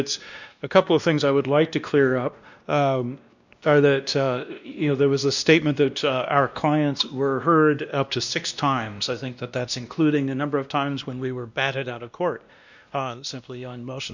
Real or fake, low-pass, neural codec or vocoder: fake; 7.2 kHz; codec, 16 kHz, 1 kbps, X-Codec, WavLM features, trained on Multilingual LibriSpeech